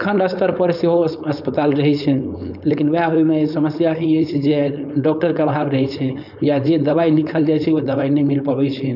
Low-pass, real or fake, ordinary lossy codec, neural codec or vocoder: 5.4 kHz; fake; none; codec, 16 kHz, 4.8 kbps, FACodec